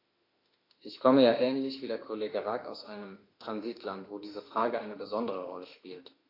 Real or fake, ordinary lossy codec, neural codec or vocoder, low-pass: fake; AAC, 32 kbps; autoencoder, 48 kHz, 32 numbers a frame, DAC-VAE, trained on Japanese speech; 5.4 kHz